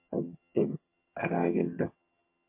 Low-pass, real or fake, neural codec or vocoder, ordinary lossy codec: 3.6 kHz; fake; vocoder, 22.05 kHz, 80 mel bands, HiFi-GAN; MP3, 24 kbps